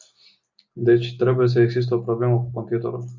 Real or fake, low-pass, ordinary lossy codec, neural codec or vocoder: real; 7.2 kHz; MP3, 64 kbps; none